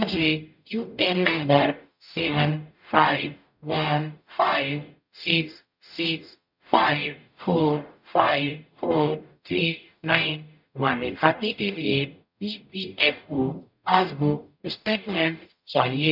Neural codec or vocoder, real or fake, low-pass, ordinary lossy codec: codec, 44.1 kHz, 0.9 kbps, DAC; fake; 5.4 kHz; none